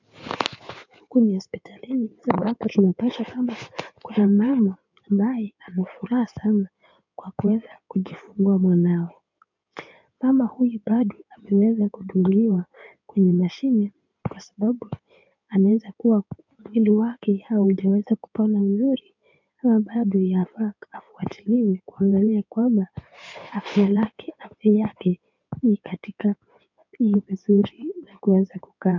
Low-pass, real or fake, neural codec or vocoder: 7.2 kHz; fake; codec, 16 kHz in and 24 kHz out, 2.2 kbps, FireRedTTS-2 codec